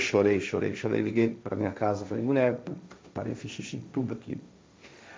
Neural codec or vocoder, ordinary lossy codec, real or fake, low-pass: codec, 16 kHz, 1.1 kbps, Voila-Tokenizer; none; fake; none